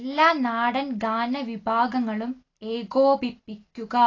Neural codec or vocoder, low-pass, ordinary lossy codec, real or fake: none; 7.2 kHz; AAC, 32 kbps; real